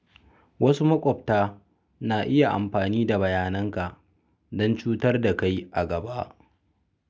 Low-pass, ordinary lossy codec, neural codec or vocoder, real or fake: none; none; none; real